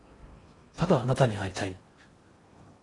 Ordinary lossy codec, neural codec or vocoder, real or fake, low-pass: AAC, 32 kbps; codec, 16 kHz in and 24 kHz out, 0.6 kbps, FocalCodec, streaming, 4096 codes; fake; 10.8 kHz